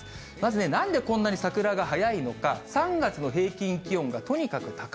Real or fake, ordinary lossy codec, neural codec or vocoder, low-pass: real; none; none; none